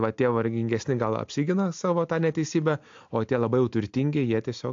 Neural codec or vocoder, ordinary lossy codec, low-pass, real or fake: none; AAC, 64 kbps; 7.2 kHz; real